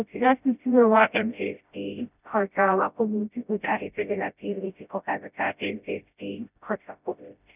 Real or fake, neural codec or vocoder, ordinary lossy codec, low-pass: fake; codec, 16 kHz, 0.5 kbps, FreqCodec, smaller model; none; 3.6 kHz